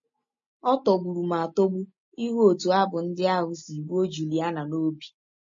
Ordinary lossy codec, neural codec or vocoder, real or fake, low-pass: MP3, 32 kbps; none; real; 7.2 kHz